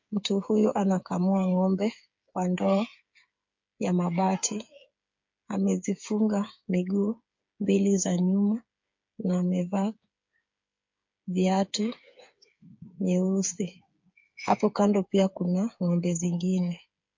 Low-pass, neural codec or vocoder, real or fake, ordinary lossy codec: 7.2 kHz; codec, 16 kHz, 8 kbps, FreqCodec, smaller model; fake; MP3, 48 kbps